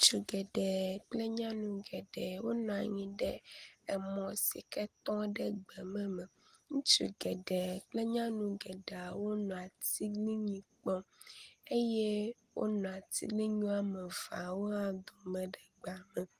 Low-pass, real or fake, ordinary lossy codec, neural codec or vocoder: 14.4 kHz; real; Opus, 24 kbps; none